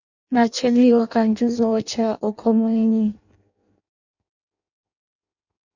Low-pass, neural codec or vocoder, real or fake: 7.2 kHz; codec, 16 kHz in and 24 kHz out, 0.6 kbps, FireRedTTS-2 codec; fake